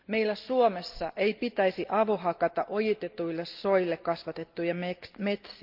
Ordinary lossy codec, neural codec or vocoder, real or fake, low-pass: Opus, 24 kbps; none; real; 5.4 kHz